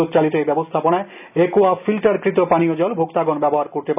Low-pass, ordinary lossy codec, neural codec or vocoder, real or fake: 3.6 kHz; none; none; real